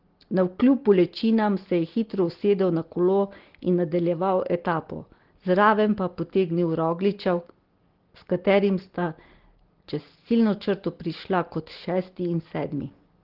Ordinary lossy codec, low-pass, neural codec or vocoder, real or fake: Opus, 16 kbps; 5.4 kHz; none; real